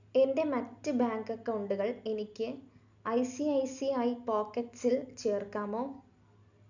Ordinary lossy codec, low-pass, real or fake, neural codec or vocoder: none; 7.2 kHz; real; none